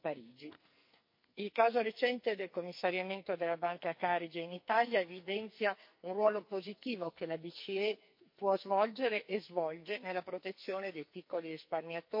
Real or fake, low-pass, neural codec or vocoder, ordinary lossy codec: fake; 5.4 kHz; codec, 44.1 kHz, 2.6 kbps, SNAC; MP3, 32 kbps